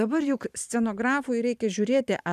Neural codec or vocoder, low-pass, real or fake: vocoder, 44.1 kHz, 128 mel bands every 512 samples, BigVGAN v2; 14.4 kHz; fake